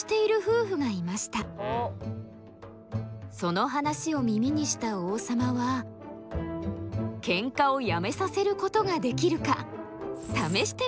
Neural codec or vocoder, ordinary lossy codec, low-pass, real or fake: none; none; none; real